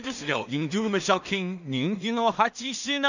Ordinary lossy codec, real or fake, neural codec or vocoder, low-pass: none; fake; codec, 16 kHz in and 24 kHz out, 0.4 kbps, LongCat-Audio-Codec, two codebook decoder; 7.2 kHz